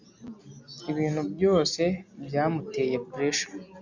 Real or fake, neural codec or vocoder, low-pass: real; none; 7.2 kHz